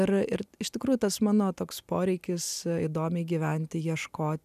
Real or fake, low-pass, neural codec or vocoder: real; 14.4 kHz; none